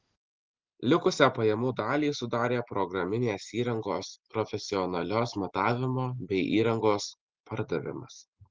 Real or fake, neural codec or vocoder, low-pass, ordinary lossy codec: real; none; 7.2 kHz; Opus, 16 kbps